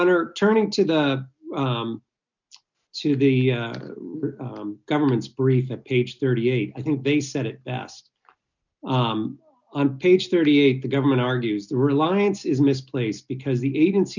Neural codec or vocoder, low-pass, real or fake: none; 7.2 kHz; real